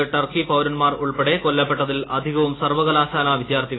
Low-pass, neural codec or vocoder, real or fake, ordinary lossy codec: 7.2 kHz; none; real; AAC, 16 kbps